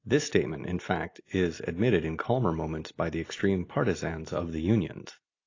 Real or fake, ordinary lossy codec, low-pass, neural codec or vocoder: real; AAC, 32 kbps; 7.2 kHz; none